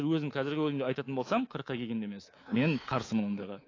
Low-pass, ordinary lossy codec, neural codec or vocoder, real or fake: 7.2 kHz; AAC, 32 kbps; codec, 24 kHz, 3.1 kbps, DualCodec; fake